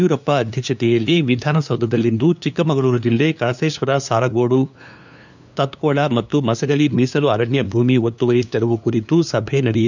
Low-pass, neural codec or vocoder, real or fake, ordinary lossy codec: 7.2 kHz; codec, 16 kHz, 2 kbps, FunCodec, trained on LibriTTS, 25 frames a second; fake; none